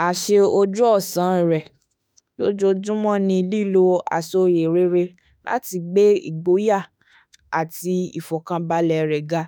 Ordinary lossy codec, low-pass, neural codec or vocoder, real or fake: none; none; autoencoder, 48 kHz, 32 numbers a frame, DAC-VAE, trained on Japanese speech; fake